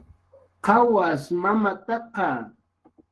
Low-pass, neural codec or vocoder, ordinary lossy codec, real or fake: 10.8 kHz; codec, 44.1 kHz, 7.8 kbps, Pupu-Codec; Opus, 16 kbps; fake